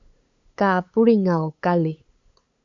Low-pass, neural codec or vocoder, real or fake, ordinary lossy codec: 7.2 kHz; codec, 16 kHz, 8 kbps, FunCodec, trained on LibriTTS, 25 frames a second; fake; AAC, 64 kbps